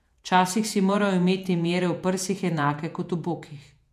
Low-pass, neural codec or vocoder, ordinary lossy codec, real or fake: 14.4 kHz; vocoder, 48 kHz, 128 mel bands, Vocos; MP3, 96 kbps; fake